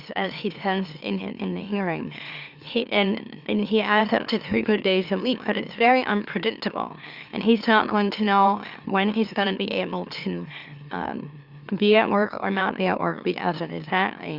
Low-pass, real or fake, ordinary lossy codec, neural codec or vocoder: 5.4 kHz; fake; Opus, 64 kbps; autoencoder, 44.1 kHz, a latent of 192 numbers a frame, MeloTTS